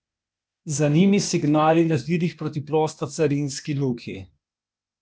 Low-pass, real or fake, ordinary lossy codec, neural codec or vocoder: none; fake; none; codec, 16 kHz, 0.8 kbps, ZipCodec